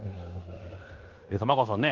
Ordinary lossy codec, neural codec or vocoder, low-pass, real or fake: Opus, 32 kbps; codec, 16 kHz in and 24 kHz out, 0.9 kbps, LongCat-Audio-Codec, fine tuned four codebook decoder; 7.2 kHz; fake